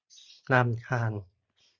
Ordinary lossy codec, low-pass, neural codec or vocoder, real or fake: Opus, 64 kbps; 7.2 kHz; none; real